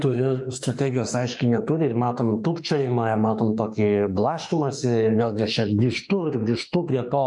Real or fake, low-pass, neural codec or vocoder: fake; 10.8 kHz; autoencoder, 48 kHz, 32 numbers a frame, DAC-VAE, trained on Japanese speech